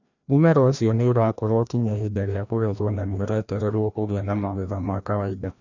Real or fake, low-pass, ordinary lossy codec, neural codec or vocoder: fake; 7.2 kHz; none; codec, 16 kHz, 1 kbps, FreqCodec, larger model